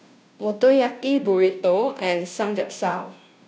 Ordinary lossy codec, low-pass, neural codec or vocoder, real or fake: none; none; codec, 16 kHz, 0.5 kbps, FunCodec, trained on Chinese and English, 25 frames a second; fake